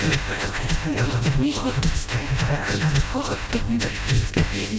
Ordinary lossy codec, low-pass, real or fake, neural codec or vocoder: none; none; fake; codec, 16 kHz, 0.5 kbps, FreqCodec, smaller model